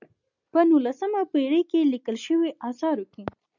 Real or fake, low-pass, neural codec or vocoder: real; 7.2 kHz; none